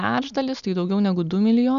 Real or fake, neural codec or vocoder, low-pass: real; none; 7.2 kHz